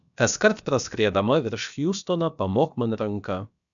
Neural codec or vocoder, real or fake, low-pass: codec, 16 kHz, about 1 kbps, DyCAST, with the encoder's durations; fake; 7.2 kHz